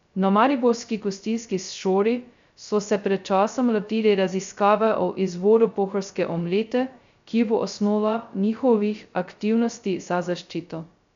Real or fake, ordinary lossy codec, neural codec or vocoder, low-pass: fake; MP3, 64 kbps; codec, 16 kHz, 0.2 kbps, FocalCodec; 7.2 kHz